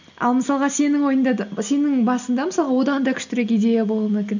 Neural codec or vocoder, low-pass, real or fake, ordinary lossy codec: none; 7.2 kHz; real; none